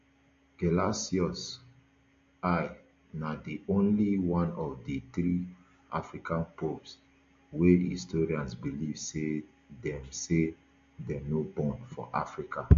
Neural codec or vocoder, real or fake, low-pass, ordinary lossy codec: none; real; 7.2 kHz; MP3, 48 kbps